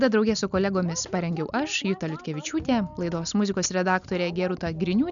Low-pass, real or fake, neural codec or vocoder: 7.2 kHz; real; none